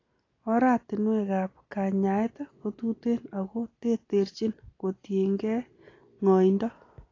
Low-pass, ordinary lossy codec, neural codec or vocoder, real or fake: 7.2 kHz; AAC, 32 kbps; none; real